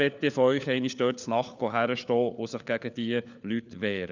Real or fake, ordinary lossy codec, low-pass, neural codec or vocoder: fake; none; 7.2 kHz; codec, 16 kHz, 4 kbps, FunCodec, trained on LibriTTS, 50 frames a second